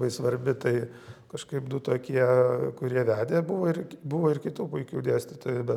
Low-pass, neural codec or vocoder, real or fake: 19.8 kHz; none; real